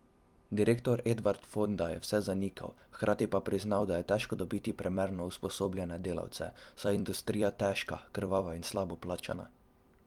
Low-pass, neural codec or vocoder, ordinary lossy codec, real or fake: 19.8 kHz; vocoder, 44.1 kHz, 128 mel bands every 256 samples, BigVGAN v2; Opus, 32 kbps; fake